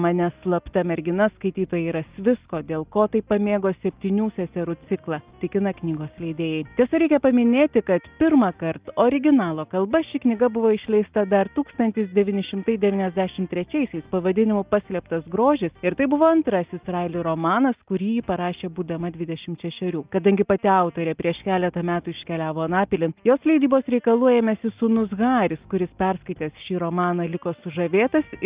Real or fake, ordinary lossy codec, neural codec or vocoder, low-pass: real; Opus, 32 kbps; none; 3.6 kHz